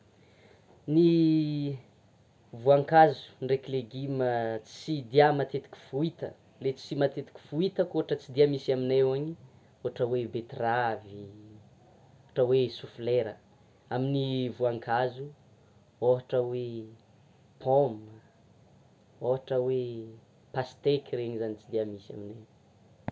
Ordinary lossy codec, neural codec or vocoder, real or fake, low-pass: none; none; real; none